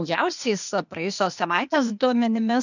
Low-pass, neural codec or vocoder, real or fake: 7.2 kHz; codec, 16 kHz, 0.8 kbps, ZipCodec; fake